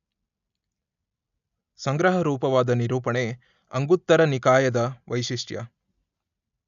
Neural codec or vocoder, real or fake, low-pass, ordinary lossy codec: none; real; 7.2 kHz; none